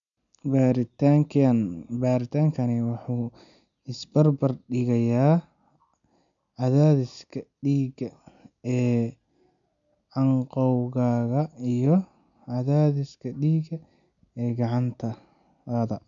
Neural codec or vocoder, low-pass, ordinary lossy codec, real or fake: none; 7.2 kHz; none; real